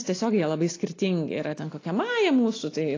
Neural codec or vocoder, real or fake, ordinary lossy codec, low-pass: none; real; AAC, 32 kbps; 7.2 kHz